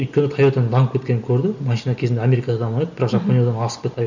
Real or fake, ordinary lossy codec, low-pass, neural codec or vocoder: real; none; 7.2 kHz; none